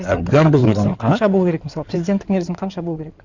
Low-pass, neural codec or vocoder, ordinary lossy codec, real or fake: 7.2 kHz; codec, 16 kHz in and 24 kHz out, 2.2 kbps, FireRedTTS-2 codec; Opus, 64 kbps; fake